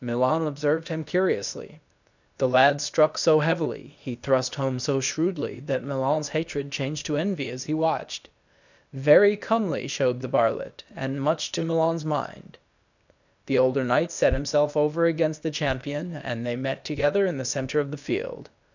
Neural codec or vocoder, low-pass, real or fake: codec, 16 kHz, 0.8 kbps, ZipCodec; 7.2 kHz; fake